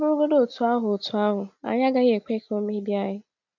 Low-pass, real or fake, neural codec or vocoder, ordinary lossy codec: 7.2 kHz; real; none; none